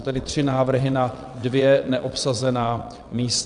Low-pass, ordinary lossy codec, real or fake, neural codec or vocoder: 9.9 kHz; MP3, 96 kbps; fake; vocoder, 22.05 kHz, 80 mel bands, WaveNeXt